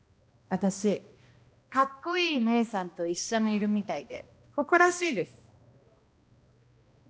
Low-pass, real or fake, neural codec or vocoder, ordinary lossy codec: none; fake; codec, 16 kHz, 1 kbps, X-Codec, HuBERT features, trained on balanced general audio; none